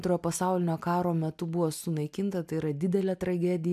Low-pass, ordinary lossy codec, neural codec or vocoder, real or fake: 14.4 kHz; MP3, 96 kbps; none; real